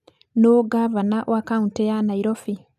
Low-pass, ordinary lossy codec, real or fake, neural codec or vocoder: 14.4 kHz; none; real; none